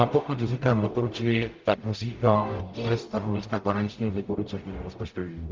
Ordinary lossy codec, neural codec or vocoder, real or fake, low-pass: Opus, 24 kbps; codec, 44.1 kHz, 0.9 kbps, DAC; fake; 7.2 kHz